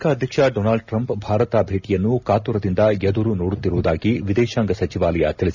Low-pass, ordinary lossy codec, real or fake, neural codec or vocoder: 7.2 kHz; none; real; none